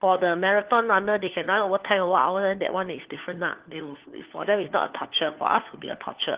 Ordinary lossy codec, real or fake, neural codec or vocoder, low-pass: Opus, 16 kbps; fake; codec, 16 kHz, 4 kbps, FunCodec, trained on LibriTTS, 50 frames a second; 3.6 kHz